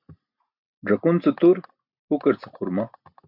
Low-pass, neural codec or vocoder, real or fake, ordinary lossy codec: 5.4 kHz; none; real; MP3, 48 kbps